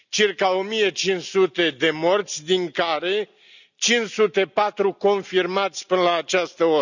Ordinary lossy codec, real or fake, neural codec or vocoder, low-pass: none; real; none; 7.2 kHz